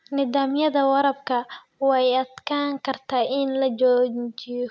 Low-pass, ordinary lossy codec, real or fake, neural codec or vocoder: none; none; real; none